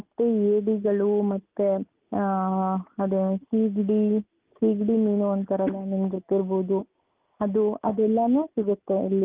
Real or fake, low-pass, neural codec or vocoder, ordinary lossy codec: real; 3.6 kHz; none; Opus, 24 kbps